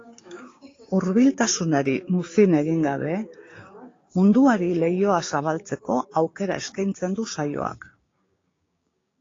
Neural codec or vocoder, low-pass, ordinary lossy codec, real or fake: codec, 16 kHz, 6 kbps, DAC; 7.2 kHz; AAC, 32 kbps; fake